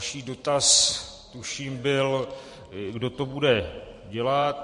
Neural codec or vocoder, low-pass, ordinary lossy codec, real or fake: vocoder, 44.1 kHz, 128 mel bands every 512 samples, BigVGAN v2; 14.4 kHz; MP3, 48 kbps; fake